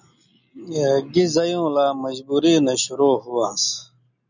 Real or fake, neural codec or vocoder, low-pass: real; none; 7.2 kHz